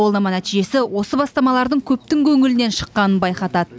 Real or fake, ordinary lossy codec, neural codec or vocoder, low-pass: real; none; none; none